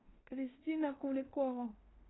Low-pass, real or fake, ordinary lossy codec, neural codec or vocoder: 7.2 kHz; fake; AAC, 16 kbps; codec, 16 kHz in and 24 kHz out, 0.9 kbps, LongCat-Audio-Codec, fine tuned four codebook decoder